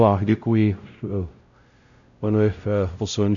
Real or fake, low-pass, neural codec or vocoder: fake; 7.2 kHz; codec, 16 kHz, 0.5 kbps, X-Codec, WavLM features, trained on Multilingual LibriSpeech